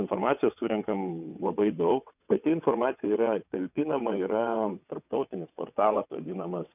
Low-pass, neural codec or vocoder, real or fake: 3.6 kHz; vocoder, 22.05 kHz, 80 mel bands, WaveNeXt; fake